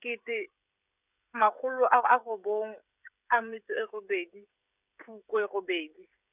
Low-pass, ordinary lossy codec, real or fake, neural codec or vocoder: 3.6 kHz; none; fake; codec, 16 kHz, 16 kbps, FreqCodec, smaller model